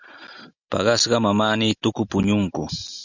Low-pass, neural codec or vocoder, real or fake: 7.2 kHz; none; real